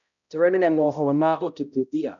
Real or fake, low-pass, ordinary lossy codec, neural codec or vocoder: fake; 7.2 kHz; none; codec, 16 kHz, 0.5 kbps, X-Codec, HuBERT features, trained on balanced general audio